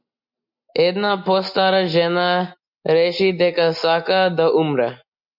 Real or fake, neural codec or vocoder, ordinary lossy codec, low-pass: real; none; MP3, 48 kbps; 5.4 kHz